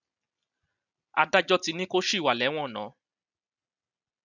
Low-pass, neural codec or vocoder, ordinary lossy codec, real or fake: 7.2 kHz; none; none; real